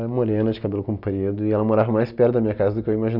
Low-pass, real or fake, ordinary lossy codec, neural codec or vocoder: 5.4 kHz; real; none; none